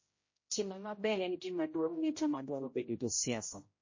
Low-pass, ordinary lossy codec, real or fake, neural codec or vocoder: 7.2 kHz; MP3, 32 kbps; fake; codec, 16 kHz, 0.5 kbps, X-Codec, HuBERT features, trained on general audio